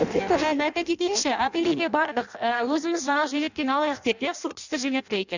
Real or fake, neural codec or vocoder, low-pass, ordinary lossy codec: fake; codec, 16 kHz in and 24 kHz out, 0.6 kbps, FireRedTTS-2 codec; 7.2 kHz; none